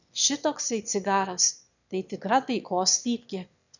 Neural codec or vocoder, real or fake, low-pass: autoencoder, 22.05 kHz, a latent of 192 numbers a frame, VITS, trained on one speaker; fake; 7.2 kHz